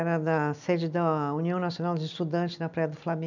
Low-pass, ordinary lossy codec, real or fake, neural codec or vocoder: 7.2 kHz; none; real; none